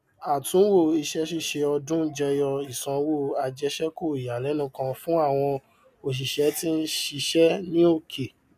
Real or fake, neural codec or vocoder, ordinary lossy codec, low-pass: real; none; none; 14.4 kHz